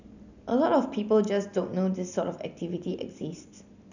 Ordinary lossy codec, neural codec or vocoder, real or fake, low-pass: none; none; real; 7.2 kHz